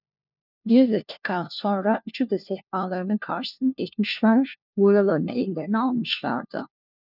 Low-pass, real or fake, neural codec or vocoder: 5.4 kHz; fake; codec, 16 kHz, 1 kbps, FunCodec, trained on LibriTTS, 50 frames a second